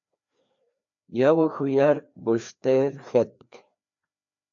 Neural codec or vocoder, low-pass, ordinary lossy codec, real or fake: codec, 16 kHz, 2 kbps, FreqCodec, larger model; 7.2 kHz; AAC, 64 kbps; fake